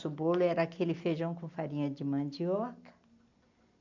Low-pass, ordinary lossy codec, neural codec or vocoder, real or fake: 7.2 kHz; none; none; real